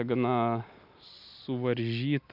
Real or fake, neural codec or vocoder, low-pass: real; none; 5.4 kHz